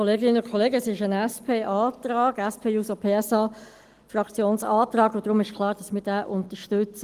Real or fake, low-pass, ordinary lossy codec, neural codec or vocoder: real; 14.4 kHz; Opus, 24 kbps; none